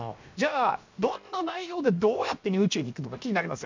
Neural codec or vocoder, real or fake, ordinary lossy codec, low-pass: codec, 16 kHz, 0.7 kbps, FocalCodec; fake; MP3, 64 kbps; 7.2 kHz